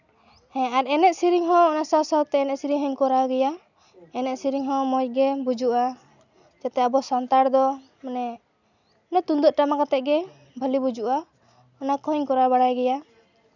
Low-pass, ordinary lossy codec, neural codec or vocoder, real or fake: 7.2 kHz; none; none; real